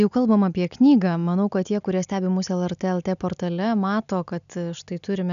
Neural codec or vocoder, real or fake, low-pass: none; real; 7.2 kHz